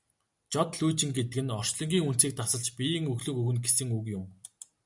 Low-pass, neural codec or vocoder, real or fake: 10.8 kHz; none; real